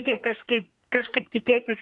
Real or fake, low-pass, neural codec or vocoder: fake; 10.8 kHz; codec, 24 kHz, 1 kbps, SNAC